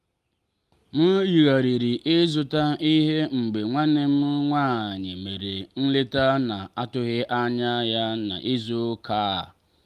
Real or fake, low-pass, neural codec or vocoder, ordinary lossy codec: real; 14.4 kHz; none; Opus, 32 kbps